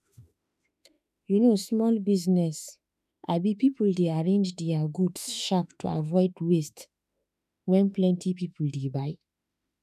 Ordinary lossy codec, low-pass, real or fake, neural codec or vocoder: none; 14.4 kHz; fake; autoencoder, 48 kHz, 32 numbers a frame, DAC-VAE, trained on Japanese speech